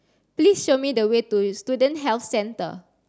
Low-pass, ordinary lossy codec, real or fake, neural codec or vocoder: none; none; real; none